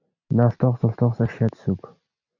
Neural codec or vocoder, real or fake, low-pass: none; real; 7.2 kHz